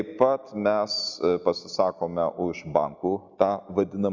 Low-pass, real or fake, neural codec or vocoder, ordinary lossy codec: 7.2 kHz; real; none; Opus, 64 kbps